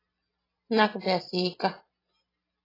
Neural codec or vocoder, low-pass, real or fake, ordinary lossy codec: none; 5.4 kHz; real; AAC, 24 kbps